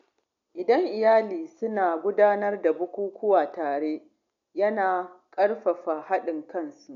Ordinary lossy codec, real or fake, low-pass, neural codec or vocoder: none; real; 7.2 kHz; none